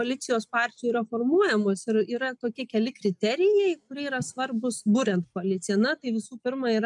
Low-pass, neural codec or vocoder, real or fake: 10.8 kHz; none; real